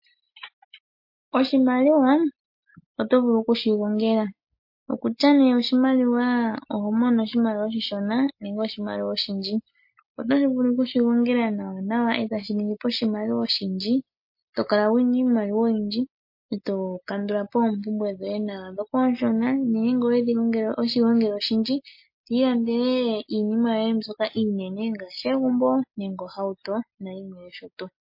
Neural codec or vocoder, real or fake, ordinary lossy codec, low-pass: none; real; MP3, 32 kbps; 5.4 kHz